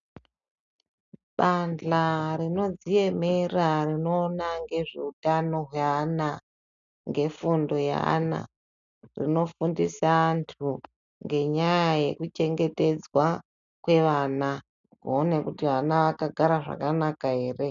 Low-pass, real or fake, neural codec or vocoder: 7.2 kHz; real; none